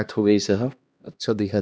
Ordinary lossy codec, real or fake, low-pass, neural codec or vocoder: none; fake; none; codec, 16 kHz, 1 kbps, X-Codec, HuBERT features, trained on LibriSpeech